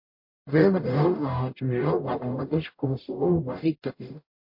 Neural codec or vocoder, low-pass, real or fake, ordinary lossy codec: codec, 44.1 kHz, 0.9 kbps, DAC; 5.4 kHz; fake; MP3, 32 kbps